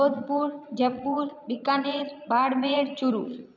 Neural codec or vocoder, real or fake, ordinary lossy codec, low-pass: vocoder, 22.05 kHz, 80 mel bands, Vocos; fake; none; 7.2 kHz